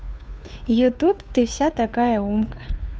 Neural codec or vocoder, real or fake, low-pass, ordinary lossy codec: codec, 16 kHz, 2 kbps, FunCodec, trained on Chinese and English, 25 frames a second; fake; none; none